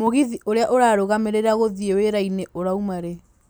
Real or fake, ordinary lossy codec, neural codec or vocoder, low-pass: real; none; none; none